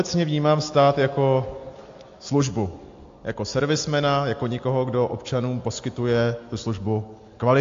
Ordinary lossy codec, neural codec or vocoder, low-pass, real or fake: AAC, 48 kbps; none; 7.2 kHz; real